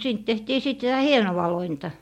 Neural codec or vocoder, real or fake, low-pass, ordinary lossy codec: none; real; 19.8 kHz; MP3, 64 kbps